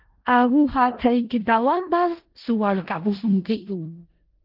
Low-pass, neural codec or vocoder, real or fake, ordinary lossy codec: 5.4 kHz; codec, 16 kHz in and 24 kHz out, 0.4 kbps, LongCat-Audio-Codec, four codebook decoder; fake; Opus, 16 kbps